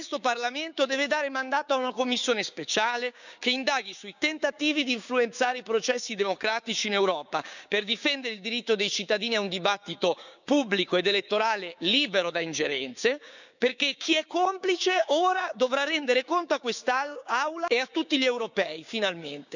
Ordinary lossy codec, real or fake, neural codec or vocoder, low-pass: none; fake; codec, 16 kHz, 6 kbps, DAC; 7.2 kHz